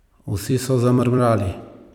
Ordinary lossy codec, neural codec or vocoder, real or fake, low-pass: none; vocoder, 44.1 kHz, 128 mel bands every 256 samples, BigVGAN v2; fake; 19.8 kHz